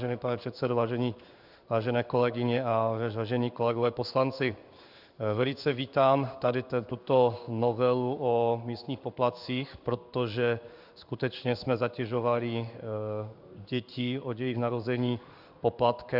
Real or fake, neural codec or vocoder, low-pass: fake; codec, 16 kHz in and 24 kHz out, 1 kbps, XY-Tokenizer; 5.4 kHz